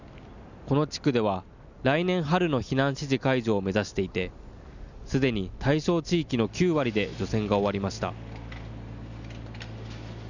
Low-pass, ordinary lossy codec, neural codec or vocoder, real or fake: 7.2 kHz; none; none; real